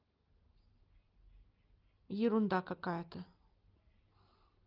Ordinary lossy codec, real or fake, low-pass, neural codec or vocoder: Opus, 32 kbps; real; 5.4 kHz; none